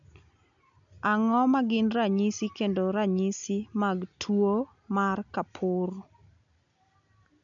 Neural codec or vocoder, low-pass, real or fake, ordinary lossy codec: none; 7.2 kHz; real; none